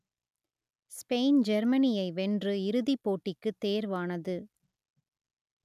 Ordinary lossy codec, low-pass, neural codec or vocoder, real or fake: none; 14.4 kHz; none; real